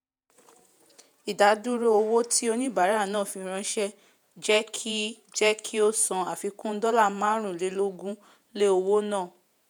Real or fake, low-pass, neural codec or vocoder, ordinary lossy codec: fake; none; vocoder, 48 kHz, 128 mel bands, Vocos; none